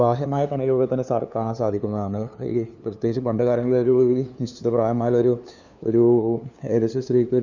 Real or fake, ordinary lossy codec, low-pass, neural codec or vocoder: fake; none; 7.2 kHz; codec, 16 kHz, 2 kbps, FunCodec, trained on LibriTTS, 25 frames a second